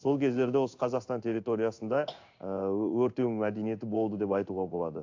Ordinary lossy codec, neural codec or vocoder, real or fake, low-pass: AAC, 48 kbps; codec, 16 kHz in and 24 kHz out, 1 kbps, XY-Tokenizer; fake; 7.2 kHz